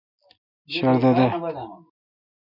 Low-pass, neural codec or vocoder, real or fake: 5.4 kHz; none; real